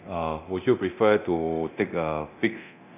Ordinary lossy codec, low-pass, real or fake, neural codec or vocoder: none; 3.6 kHz; fake; codec, 24 kHz, 0.9 kbps, DualCodec